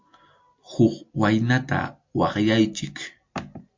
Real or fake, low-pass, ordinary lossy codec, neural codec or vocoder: real; 7.2 kHz; MP3, 48 kbps; none